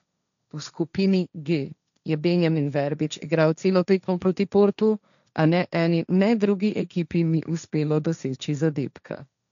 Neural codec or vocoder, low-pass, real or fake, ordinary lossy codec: codec, 16 kHz, 1.1 kbps, Voila-Tokenizer; 7.2 kHz; fake; none